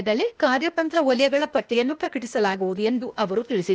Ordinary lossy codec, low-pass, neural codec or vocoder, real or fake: none; none; codec, 16 kHz, 0.8 kbps, ZipCodec; fake